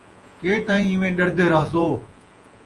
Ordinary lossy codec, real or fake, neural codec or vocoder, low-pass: Opus, 32 kbps; fake; vocoder, 48 kHz, 128 mel bands, Vocos; 10.8 kHz